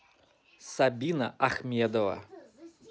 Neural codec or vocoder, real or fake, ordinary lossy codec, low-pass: none; real; none; none